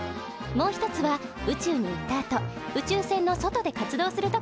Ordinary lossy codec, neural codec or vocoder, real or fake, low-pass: none; none; real; none